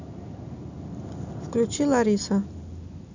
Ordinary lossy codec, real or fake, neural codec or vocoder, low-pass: none; real; none; 7.2 kHz